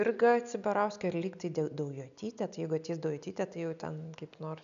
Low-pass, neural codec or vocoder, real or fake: 7.2 kHz; none; real